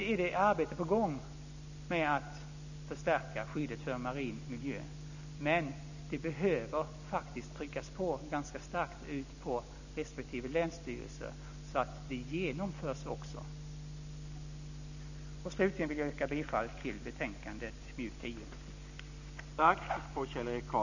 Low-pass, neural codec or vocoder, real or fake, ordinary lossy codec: 7.2 kHz; none; real; none